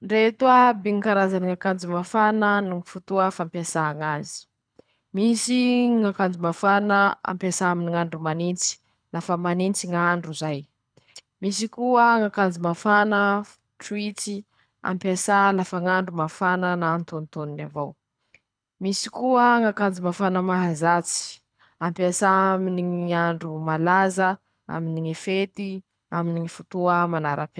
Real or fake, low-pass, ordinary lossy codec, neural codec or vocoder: fake; 9.9 kHz; none; codec, 24 kHz, 6 kbps, HILCodec